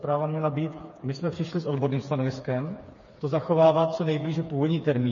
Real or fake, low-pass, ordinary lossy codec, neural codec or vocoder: fake; 7.2 kHz; MP3, 32 kbps; codec, 16 kHz, 4 kbps, FreqCodec, smaller model